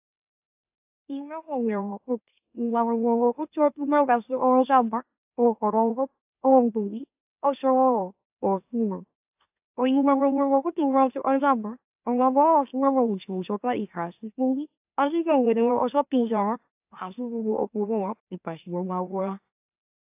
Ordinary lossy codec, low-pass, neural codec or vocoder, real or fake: AAC, 32 kbps; 3.6 kHz; autoencoder, 44.1 kHz, a latent of 192 numbers a frame, MeloTTS; fake